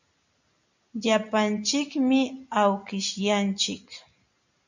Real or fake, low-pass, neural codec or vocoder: real; 7.2 kHz; none